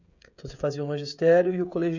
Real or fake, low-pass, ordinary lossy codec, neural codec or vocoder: fake; 7.2 kHz; none; codec, 16 kHz, 16 kbps, FreqCodec, smaller model